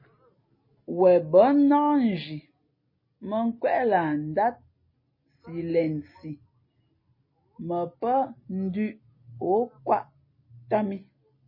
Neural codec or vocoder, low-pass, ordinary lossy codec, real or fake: none; 5.4 kHz; MP3, 24 kbps; real